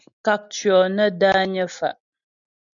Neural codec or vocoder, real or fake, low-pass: none; real; 7.2 kHz